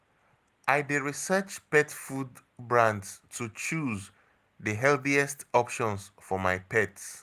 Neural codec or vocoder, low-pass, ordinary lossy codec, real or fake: none; 14.4 kHz; Opus, 32 kbps; real